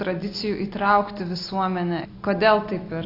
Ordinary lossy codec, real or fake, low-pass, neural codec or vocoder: AAC, 32 kbps; real; 5.4 kHz; none